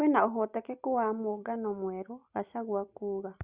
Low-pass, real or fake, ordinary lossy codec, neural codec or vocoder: 3.6 kHz; real; Opus, 64 kbps; none